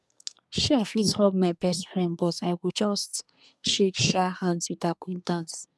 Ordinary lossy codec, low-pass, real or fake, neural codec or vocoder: none; none; fake; codec, 24 kHz, 1 kbps, SNAC